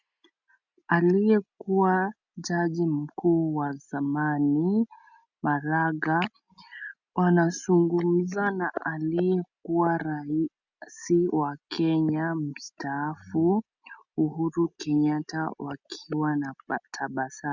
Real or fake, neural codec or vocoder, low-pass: real; none; 7.2 kHz